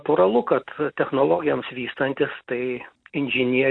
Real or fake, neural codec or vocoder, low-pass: real; none; 9.9 kHz